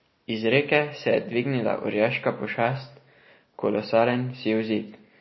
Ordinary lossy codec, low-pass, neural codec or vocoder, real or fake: MP3, 24 kbps; 7.2 kHz; none; real